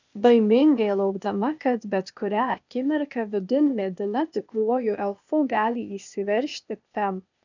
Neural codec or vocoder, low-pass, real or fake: codec, 16 kHz, 0.8 kbps, ZipCodec; 7.2 kHz; fake